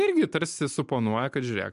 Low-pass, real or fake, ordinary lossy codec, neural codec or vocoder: 10.8 kHz; real; MP3, 64 kbps; none